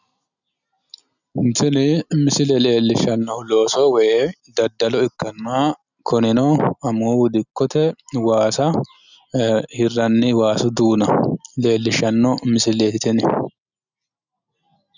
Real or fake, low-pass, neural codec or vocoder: real; 7.2 kHz; none